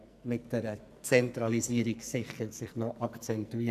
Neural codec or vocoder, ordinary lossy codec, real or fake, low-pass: codec, 44.1 kHz, 2.6 kbps, SNAC; none; fake; 14.4 kHz